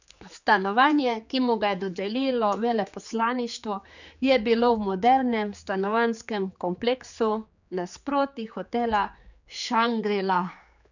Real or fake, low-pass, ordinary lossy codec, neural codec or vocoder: fake; 7.2 kHz; none; codec, 16 kHz, 4 kbps, X-Codec, HuBERT features, trained on general audio